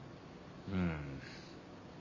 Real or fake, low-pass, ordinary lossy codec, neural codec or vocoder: real; 7.2 kHz; MP3, 32 kbps; none